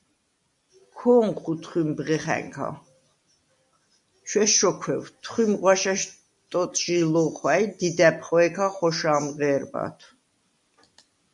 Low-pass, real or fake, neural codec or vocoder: 10.8 kHz; real; none